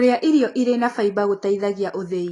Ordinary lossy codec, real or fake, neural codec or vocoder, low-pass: AAC, 32 kbps; real; none; 10.8 kHz